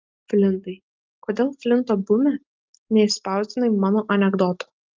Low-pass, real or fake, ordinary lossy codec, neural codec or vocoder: 7.2 kHz; real; Opus, 32 kbps; none